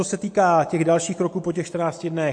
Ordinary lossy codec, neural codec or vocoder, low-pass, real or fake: MP3, 48 kbps; none; 9.9 kHz; real